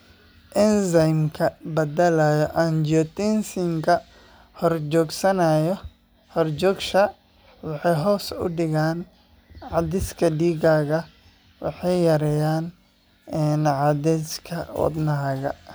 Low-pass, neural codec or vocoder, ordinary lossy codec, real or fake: none; none; none; real